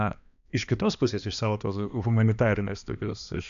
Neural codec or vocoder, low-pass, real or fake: codec, 16 kHz, 2 kbps, X-Codec, HuBERT features, trained on balanced general audio; 7.2 kHz; fake